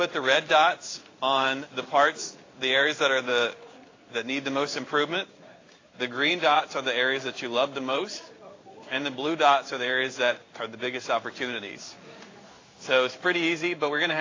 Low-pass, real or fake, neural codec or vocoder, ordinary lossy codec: 7.2 kHz; fake; codec, 16 kHz in and 24 kHz out, 1 kbps, XY-Tokenizer; AAC, 32 kbps